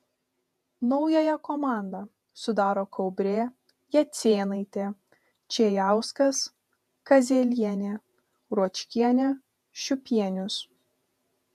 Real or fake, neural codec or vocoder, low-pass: fake; vocoder, 48 kHz, 128 mel bands, Vocos; 14.4 kHz